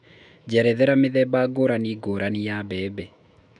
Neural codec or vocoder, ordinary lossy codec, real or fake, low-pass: autoencoder, 48 kHz, 128 numbers a frame, DAC-VAE, trained on Japanese speech; none; fake; 10.8 kHz